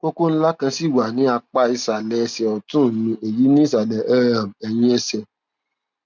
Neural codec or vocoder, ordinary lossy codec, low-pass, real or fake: none; none; 7.2 kHz; real